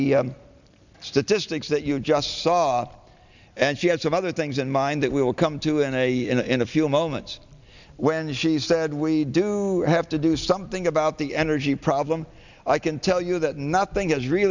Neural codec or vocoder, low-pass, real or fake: none; 7.2 kHz; real